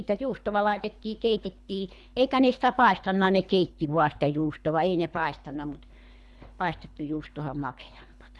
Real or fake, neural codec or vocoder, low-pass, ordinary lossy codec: fake; codec, 24 kHz, 3 kbps, HILCodec; none; none